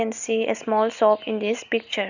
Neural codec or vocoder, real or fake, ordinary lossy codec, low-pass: none; real; none; 7.2 kHz